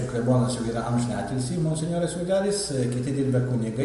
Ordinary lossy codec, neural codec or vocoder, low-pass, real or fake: MP3, 48 kbps; none; 14.4 kHz; real